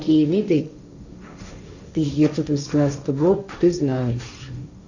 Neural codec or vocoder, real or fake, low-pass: codec, 16 kHz, 1.1 kbps, Voila-Tokenizer; fake; 7.2 kHz